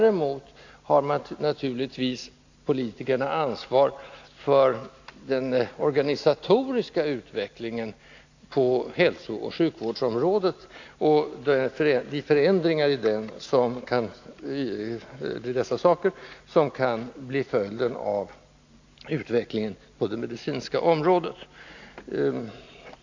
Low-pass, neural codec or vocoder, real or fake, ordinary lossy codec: 7.2 kHz; none; real; AAC, 48 kbps